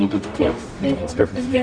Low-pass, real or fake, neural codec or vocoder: 9.9 kHz; fake; codec, 44.1 kHz, 0.9 kbps, DAC